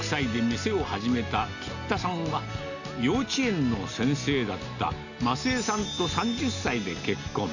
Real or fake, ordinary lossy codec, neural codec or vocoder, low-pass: real; none; none; 7.2 kHz